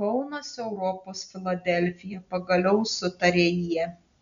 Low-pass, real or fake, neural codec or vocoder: 7.2 kHz; real; none